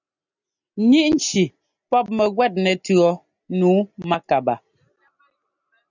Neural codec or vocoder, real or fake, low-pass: none; real; 7.2 kHz